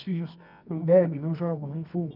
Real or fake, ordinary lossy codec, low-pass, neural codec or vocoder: fake; none; 5.4 kHz; codec, 24 kHz, 0.9 kbps, WavTokenizer, medium music audio release